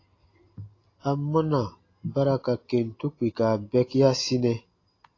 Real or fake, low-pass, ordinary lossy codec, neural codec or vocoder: real; 7.2 kHz; AAC, 32 kbps; none